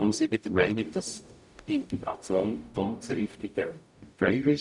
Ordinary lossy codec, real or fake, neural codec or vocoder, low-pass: none; fake; codec, 44.1 kHz, 0.9 kbps, DAC; 10.8 kHz